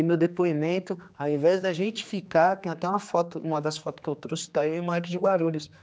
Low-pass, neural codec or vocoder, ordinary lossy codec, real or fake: none; codec, 16 kHz, 2 kbps, X-Codec, HuBERT features, trained on general audio; none; fake